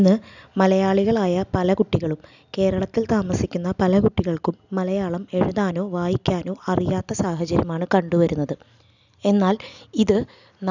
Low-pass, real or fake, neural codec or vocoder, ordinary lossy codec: 7.2 kHz; real; none; none